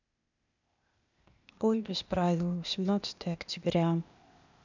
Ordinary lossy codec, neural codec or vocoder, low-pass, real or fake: none; codec, 16 kHz, 0.8 kbps, ZipCodec; 7.2 kHz; fake